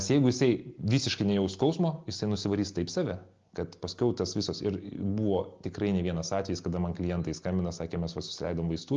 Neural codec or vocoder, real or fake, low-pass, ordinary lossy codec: none; real; 7.2 kHz; Opus, 32 kbps